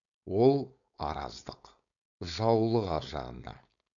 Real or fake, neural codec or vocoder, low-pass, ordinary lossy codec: fake; codec, 16 kHz, 4.8 kbps, FACodec; 7.2 kHz; none